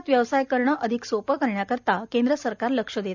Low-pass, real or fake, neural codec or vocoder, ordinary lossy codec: 7.2 kHz; real; none; none